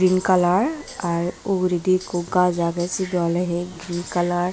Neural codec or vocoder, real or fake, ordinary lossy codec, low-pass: none; real; none; none